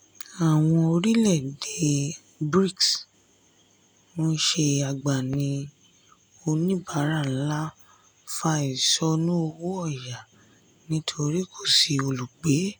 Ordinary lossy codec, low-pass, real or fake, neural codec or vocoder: none; none; real; none